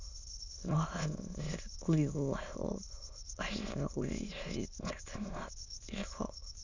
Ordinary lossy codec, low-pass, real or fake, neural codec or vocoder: none; 7.2 kHz; fake; autoencoder, 22.05 kHz, a latent of 192 numbers a frame, VITS, trained on many speakers